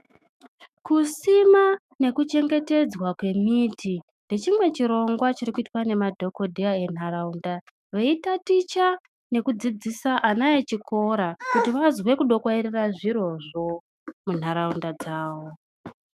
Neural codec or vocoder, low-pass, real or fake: autoencoder, 48 kHz, 128 numbers a frame, DAC-VAE, trained on Japanese speech; 14.4 kHz; fake